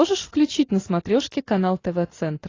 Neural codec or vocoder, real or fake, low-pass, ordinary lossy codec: none; real; 7.2 kHz; AAC, 32 kbps